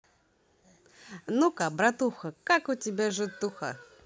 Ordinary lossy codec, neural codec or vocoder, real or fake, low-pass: none; none; real; none